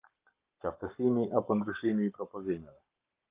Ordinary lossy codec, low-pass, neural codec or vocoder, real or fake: Opus, 24 kbps; 3.6 kHz; codec, 44.1 kHz, 7.8 kbps, Pupu-Codec; fake